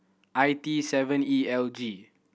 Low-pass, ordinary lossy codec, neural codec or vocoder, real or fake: none; none; none; real